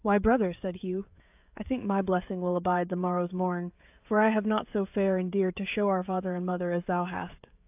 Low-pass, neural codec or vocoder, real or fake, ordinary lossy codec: 3.6 kHz; codec, 16 kHz, 16 kbps, FreqCodec, smaller model; fake; AAC, 32 kbps